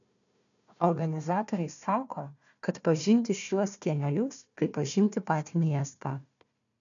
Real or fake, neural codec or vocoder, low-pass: fake; codec, 16 kHz, 1 kbps, FunCodec, trained on Chinese and English, 50 frames a second; 7.2 kHz